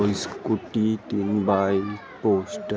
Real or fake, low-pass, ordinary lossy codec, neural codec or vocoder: real; none; none; none